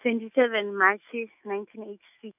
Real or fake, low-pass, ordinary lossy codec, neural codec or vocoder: fake; 3.6 kHz; none; autoencoder, 48 kHz, 128 numbers a frame, DAC-VAE, trained on Japanese speech